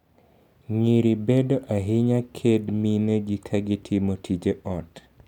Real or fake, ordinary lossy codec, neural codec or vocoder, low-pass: real; none; none; 19.8 kHz